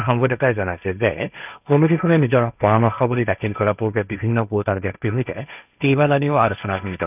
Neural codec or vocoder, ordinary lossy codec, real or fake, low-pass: codec, 16 kHz, 1.1 kbps, Voila-Tokenizer; none; fake; 3.6 kHz